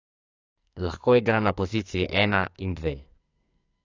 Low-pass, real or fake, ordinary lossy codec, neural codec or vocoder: 7.2 kHz; fake; AAC, 48 kbps; codec, 44.1 kHz, 2.6 kbps, SNAC